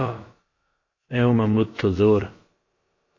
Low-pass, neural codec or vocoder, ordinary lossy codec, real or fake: 7.2 kHz; codec, 16 kHz, about 1 kbps, DyCAST, with the encoder's durations; MP3, 32 kbps; fake